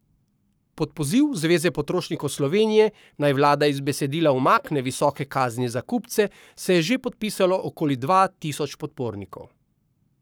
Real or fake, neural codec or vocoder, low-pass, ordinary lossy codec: fake; codec, 44.1 kHz, 7.8 kbps, Pupu-Codec; none; none